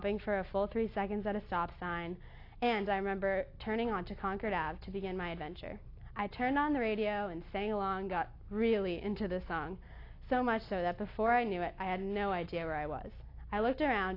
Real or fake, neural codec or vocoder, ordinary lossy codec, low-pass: real; none; AAC, 32 kbps; 5.4 kHz